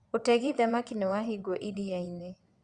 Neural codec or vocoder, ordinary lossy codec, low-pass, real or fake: vocoder, 22.05 kHz, 80 mel bands, WaveNeXt; Opus, 64 kbps; 9.9 kHz; fake